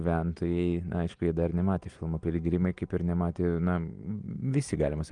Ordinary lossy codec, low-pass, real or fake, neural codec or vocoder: Opus, 24 kbps; 9.9 kHz; real; none